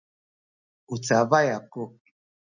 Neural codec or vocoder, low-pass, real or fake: none; 7.2 kHz; real